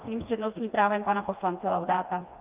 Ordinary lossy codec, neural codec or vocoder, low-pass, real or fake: Opus, 24 kbps; codec, 16 kHz, 2 kbps, FreqCodec, smaller model; 3.6 kHz; fake